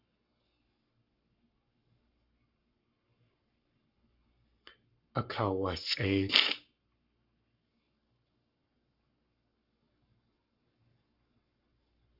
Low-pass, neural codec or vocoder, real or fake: 5.4 kHz; codec, 44.1 kHz, 7.8 kbps, Pupu-Codec; fake